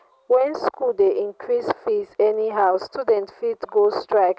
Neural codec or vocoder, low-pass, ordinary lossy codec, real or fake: none; none; none; real